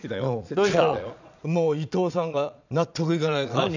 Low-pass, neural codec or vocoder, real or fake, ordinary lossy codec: 7.2 kHz; vocoder, 22.05 kHz, 80 mel bands, Vocos; fake; none